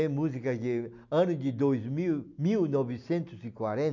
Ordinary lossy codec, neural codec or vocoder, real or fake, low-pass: none; none; real; 7.2 kHz